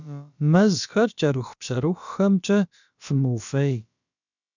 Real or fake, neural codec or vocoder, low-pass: fake; codec, 16 kHz, about 1 kbps, DyCAST, with the encoder's durations; 7.2 kHz